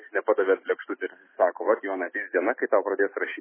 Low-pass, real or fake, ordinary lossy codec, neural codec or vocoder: 3.6 kHz; real; MP3, 16 kbps; none